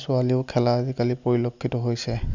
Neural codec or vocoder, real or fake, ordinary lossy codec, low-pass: none; real; none; 7.2 kHz